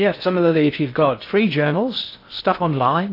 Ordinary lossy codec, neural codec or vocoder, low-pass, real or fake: AAC, 32 kbps; codec, 16 kHz in and 24 kHz out, 0.6 kbps, FocalCodec, streaming, 4096 codes; 5.4 kHz; fake